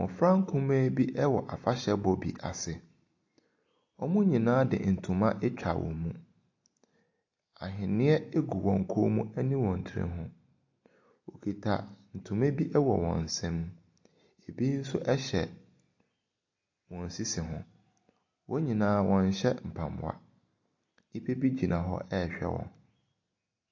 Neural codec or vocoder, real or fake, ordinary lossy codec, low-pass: none; real; AAC, 48 kbps; 7.2 kHz